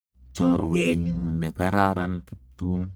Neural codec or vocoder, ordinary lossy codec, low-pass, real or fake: codec, 44.1 kHz, 1.7 kbps, Pupu-Codec; none; none; fake